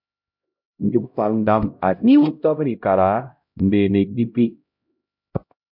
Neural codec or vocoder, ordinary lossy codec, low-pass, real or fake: codec, 16 kHz, 0.5 kbps, X-Codec, HuBERT features, trained on LibriSpeech; MP3, 48 kbps; 5.4 kHz; fake